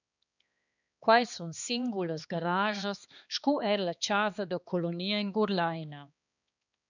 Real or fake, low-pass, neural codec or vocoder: fake; 7.2 kHz; codec, 16 kHz, 2 kbps, X-Codec, HuBERT features, trained on balanced general audio